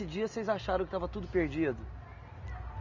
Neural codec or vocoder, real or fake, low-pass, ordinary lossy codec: none; real; 7.2 kHz; none